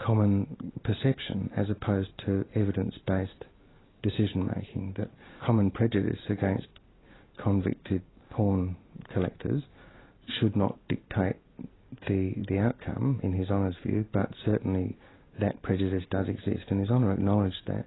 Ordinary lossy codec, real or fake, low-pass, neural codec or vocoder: AAC, 16 kbps; real; 7.2 kHz; none